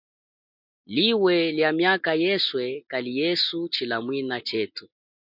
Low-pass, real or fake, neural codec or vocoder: 5.4 kHz; real; none